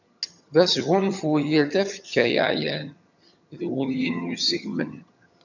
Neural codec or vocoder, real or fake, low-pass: vocoder, 22.05 kHz, 80 mel bands, HiFi-GAN; fake; 7.2 kHz